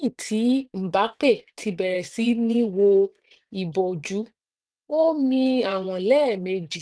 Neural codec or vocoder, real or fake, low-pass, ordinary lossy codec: vocoder, 22.05 kHz, 80 mel bands, WaveNeXt; fake; none; none